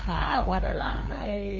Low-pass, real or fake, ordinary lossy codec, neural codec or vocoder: 7.2 kHz; fake; MP3, 32 kbps; codec, 16 kHz, 2 kbps, FunCodec, trained on LibriTTS, 25 frames a second